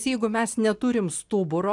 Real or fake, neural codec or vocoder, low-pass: real; none; 10.8 kHz